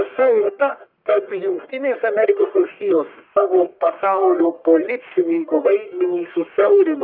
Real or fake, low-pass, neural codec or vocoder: fake; 5.4 kHz; codec, 44.1 kHz, 1.7 kbps, Pupu-Codec